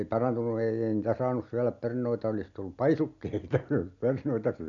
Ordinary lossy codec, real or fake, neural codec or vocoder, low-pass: none; real; none; 7.2 kHz